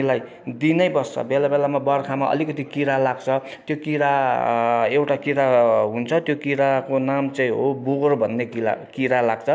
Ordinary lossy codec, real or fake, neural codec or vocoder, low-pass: none; real; none; none